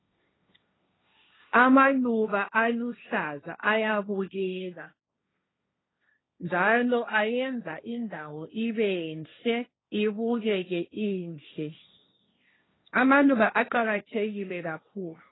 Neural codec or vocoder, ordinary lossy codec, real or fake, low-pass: codec, 16 kHz, 1.1 kbps, Voila-Tokenizer; AAC, 16 kbps; fake; 7.2 kHz